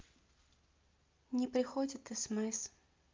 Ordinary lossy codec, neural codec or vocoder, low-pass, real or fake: Opus, 24 kbps; none; 7.2 kHz; real